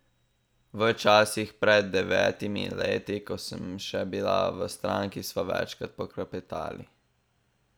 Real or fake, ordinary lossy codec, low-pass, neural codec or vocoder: real; none; none; none